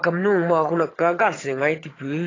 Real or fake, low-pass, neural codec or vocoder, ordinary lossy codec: fake; 7.2 kHz; vocoder, 22.05 kHz, 80 mel bands, HiFi-GAN; AAC, 32 kbps